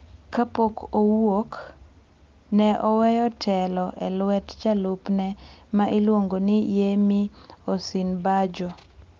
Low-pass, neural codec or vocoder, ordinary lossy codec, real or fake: 7.2 kHz; none; Opus, 32 kbps; real